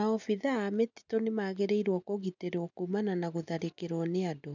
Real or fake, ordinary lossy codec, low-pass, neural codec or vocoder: real; none; 7.2 kHz; none